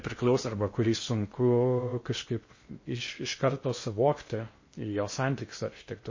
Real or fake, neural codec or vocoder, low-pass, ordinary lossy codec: fake; codec, 16 kHz in and 24 kHz out, 0.6 kbps, FocalCodec, streaming, 2048 codes; 7.2 kHz; MP3, 32 kbps